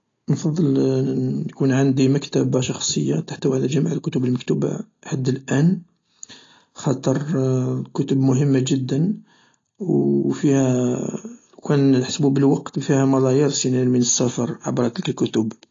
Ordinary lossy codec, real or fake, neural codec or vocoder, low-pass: AAC, 32 kbps; real; none; 7.2 kHz